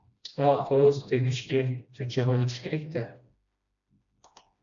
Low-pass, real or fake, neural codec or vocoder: 7.2 kHz; fake; codec, 16 kHz, 1 kbps, FreqCodec, smaller model